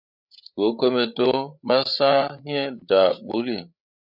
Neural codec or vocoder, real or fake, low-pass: codec, 16 kHz, 8 kbps, FreqCodec, larger model; fake; 5.4 kHz